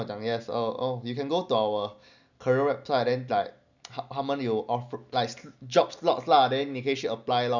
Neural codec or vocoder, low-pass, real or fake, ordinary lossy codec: none; 7.2 kHz; real; none